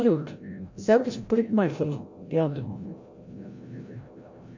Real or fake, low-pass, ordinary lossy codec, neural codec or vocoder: fake; 7.2 kHz; MP3, 48 kbps; codec, 16 kHz, 0.5 kbps, FreqCodec, larger model